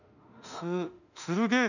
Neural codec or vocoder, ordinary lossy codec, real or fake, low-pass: autoencoder, 48 kHz, 32 numbers a frame, DAC-VAE, trained on Japanese speech; none; fake; 7.2 kHz